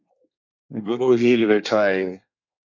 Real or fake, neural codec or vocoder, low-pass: fake; codec, 24 kHz, 1 kbps, SNAC; 7.2 kHz